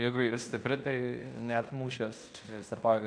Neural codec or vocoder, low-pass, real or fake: codec, 16 kHz in and 24 kHz out, 0.9 kbps, LongCat-Audio-Codec, fine tuned four codebook decoder; 9.9 kHz; fake